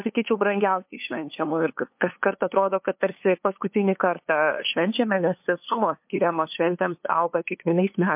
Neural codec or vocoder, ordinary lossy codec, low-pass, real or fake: codec, 16 kHz, 2 kbps, FunCodec, trained on LibriTTS, 25 frames a second; MP3, 32 kbps; 3.6 kHz; fake